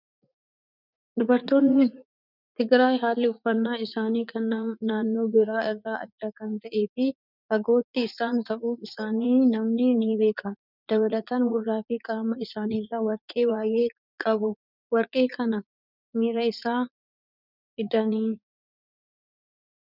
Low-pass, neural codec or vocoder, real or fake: 5.4 kHz; vocoder, 44.1 kHz, 80 mel bands, Vocos; fake